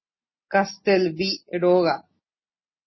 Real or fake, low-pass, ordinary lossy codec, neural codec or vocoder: real; 7.2 kHz; MP3, 24 kbps; none